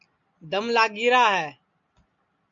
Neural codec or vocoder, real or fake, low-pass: none; real; 7.2 kHz